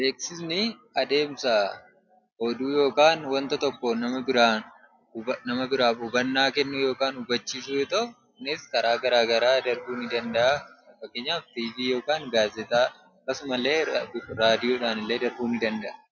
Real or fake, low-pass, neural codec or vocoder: real; 7.2 kHz; none